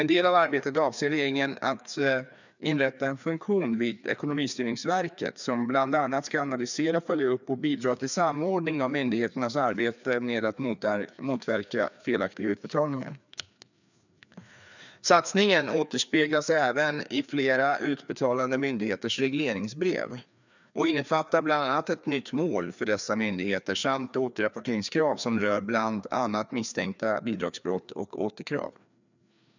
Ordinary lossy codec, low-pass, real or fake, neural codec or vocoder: none; 7.2 kHz; fake; codec, 16 kHz, 2 kbps, FreqCodec, larger model